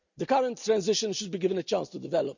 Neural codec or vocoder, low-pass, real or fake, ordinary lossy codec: none; 7.2 kHz; real; none